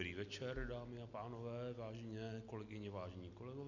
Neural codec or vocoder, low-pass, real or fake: none; 7.2 kHz; real